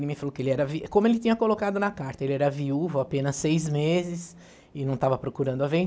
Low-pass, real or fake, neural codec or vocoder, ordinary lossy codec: none; real; none; none